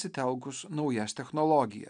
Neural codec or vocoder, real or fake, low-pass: none; real; 9.9 kHz